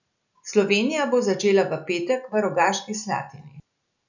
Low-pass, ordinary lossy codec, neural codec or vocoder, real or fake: 7.2 kHz; none; none; real